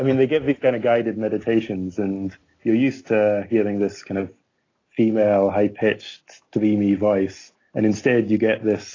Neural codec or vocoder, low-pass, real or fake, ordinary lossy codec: none; 7.2 kHz; real; AAC, 32 kbps